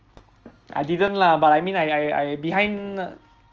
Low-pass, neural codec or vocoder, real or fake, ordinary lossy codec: 7.2 kHz; none; real; Opus, 24 kbps